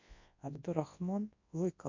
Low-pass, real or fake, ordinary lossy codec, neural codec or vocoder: 7.2 kHz; fake; MP3, 48 kbps; codec, 24 kHz, 0.9 kbps, WavTokenizer, large speech release